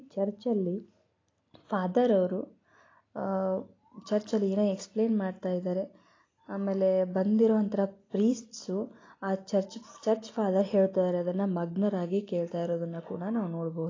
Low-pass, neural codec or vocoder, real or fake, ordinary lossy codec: 7.2 kHz; none; real; AAC, 32 kbps